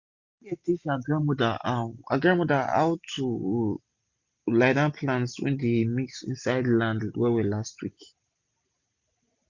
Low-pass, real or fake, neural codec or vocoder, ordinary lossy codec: 7.2 kHz; real; none; Opus, 64 kbps